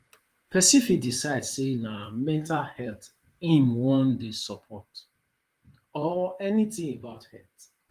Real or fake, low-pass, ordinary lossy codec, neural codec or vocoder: fake; 14.4 kHz; Opus, 32 kbps; vocoder, 44.1 kHz, 128 mel bands, Pupu-Vocoder